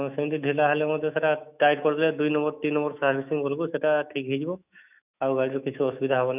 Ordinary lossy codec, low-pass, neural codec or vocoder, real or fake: none; 3.6 kHz; autoencoder, 48 kHz, 128 numbers a frame, DAC-VAE, trained on Japanese speech; fake